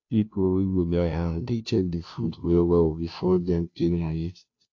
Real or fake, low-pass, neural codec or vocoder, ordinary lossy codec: fake; 7.2 kHz; codec, 16 kHz, 0.5 kbps, FunCodec, trained on Chinese and English, 25 frames a second; AAC, 48 kbps